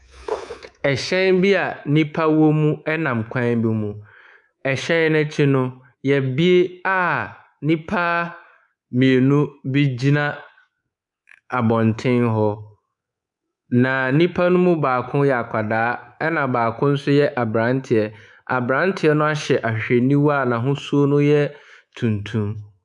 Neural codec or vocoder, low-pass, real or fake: codec, 24 kHz, 3.1 kbps, DualCodec; 10.8 kHz; fake